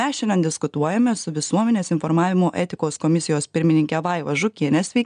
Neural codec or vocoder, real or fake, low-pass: none; real; 9.9 kHz